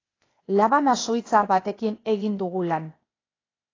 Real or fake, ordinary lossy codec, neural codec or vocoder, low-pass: fake; AAC, 32 kbps; codec, 16 kHz, 0.8 kbps, ZipCodec; 7.2 kHz